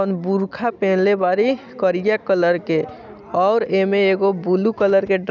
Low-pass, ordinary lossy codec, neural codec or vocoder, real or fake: 7.2 kHz; none; none; real